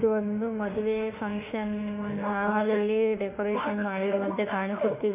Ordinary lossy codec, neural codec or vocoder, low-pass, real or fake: none; autoencoder, 48 kHz, 32 numbers a frame, DAC-VAE, trained on Japanese speech; 3.6 kHz; fake